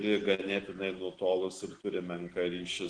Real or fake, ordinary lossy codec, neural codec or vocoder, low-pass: real; Opus, 16 kbps; none; 9.9 kHz